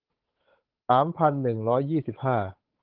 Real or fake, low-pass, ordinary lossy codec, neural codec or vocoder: fake; 5.4 kHz; Opus, 16 kbps; codec, 16 kHz, 8 kbps, FunCodec, trained on Chinese and English, 25 frames a second